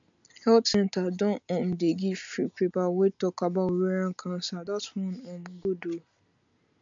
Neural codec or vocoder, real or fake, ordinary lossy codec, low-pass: none; real; MP3, 64 kbps; 7.2 kHz